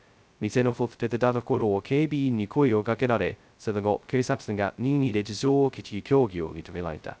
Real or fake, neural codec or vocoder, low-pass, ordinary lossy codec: fake; codec, 16 kHz, 0.2 kbps, FocalCodec; none; none